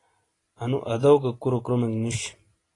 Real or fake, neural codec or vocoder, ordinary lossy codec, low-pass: real; none; AAC, 32 kbps; 10.8 kHz